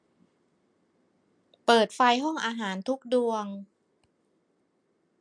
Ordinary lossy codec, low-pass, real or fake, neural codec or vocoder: MP3, 64 kbps; 9.9 kHz; fake; vocoder, 44.1 kHz, 128 mel bands every 256 samples, BigVGAN v2